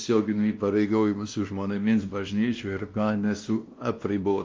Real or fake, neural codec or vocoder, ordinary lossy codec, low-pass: fake; codec, 16 kHz, 1 kbps, X-Codec, WavLM features, trained on Multilingual LibriSpeech; Opus, 24 kbps; 7.2 kHz